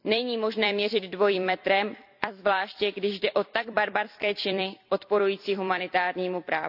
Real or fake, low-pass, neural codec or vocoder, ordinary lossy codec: fake; 5.4 kHz; vocoder, 44.1 kHz, 128 mel bands every 256 samples, BigVGAN v2; AAC, 48 kbps